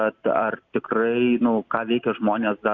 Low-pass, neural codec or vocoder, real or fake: 7.2 kHz; none; real